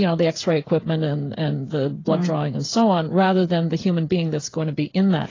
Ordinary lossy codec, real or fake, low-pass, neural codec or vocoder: AAC, 32 kbps; fake; 7.2 kHz; codec, 16 kHz, 8 kbps, FreqCodec, smaller model